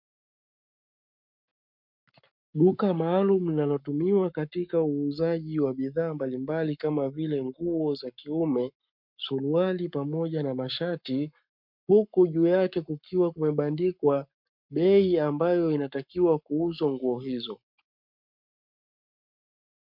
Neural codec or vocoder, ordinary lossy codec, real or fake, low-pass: codec, 44.1 kHz, 7.8 kbps, Pupu-Codec; MP3, 48 kbps; fake; 5.4 kHz